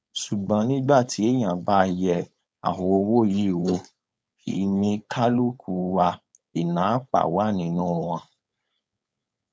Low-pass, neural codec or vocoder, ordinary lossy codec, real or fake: none; codec, 16 kHz, 4.8 kbps, FACodec; none; fake